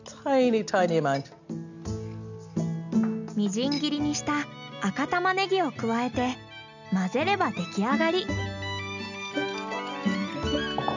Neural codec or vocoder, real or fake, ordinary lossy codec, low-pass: none; real; none; 7.2 kHz